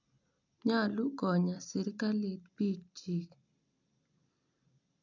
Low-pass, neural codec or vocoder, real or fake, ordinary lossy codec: 7.2 kHz; none; real; none